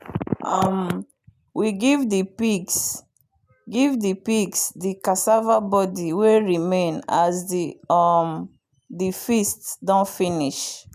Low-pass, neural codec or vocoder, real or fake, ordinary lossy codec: 14.4 kHz; none; real; none